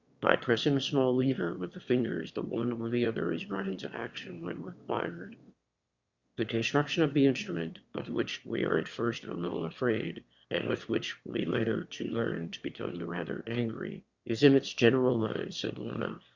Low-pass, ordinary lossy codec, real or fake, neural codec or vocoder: 7.2 kHz; Opus, 64 kbps; fake; autoencoder, 22.05 kHz, a latent of 192 numbers a frame, VITS, trained on one speaker